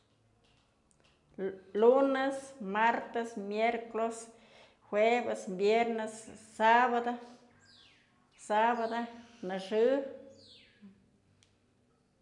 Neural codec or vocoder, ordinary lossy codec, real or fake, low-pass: none; none; real; 10.8 kHz